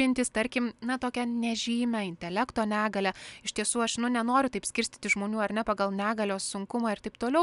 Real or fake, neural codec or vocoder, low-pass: real; none; 10.8 kHz